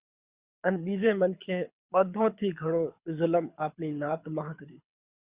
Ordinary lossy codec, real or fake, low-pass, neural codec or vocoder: Opus, 64 kbps; fake; 3.6 kHz; codec, 24 kHz, 6 kbps, HILCodec